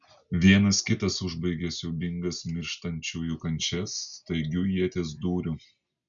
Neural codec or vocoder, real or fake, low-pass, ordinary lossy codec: none; real; 7.2 kHz; MP3, 96 kbps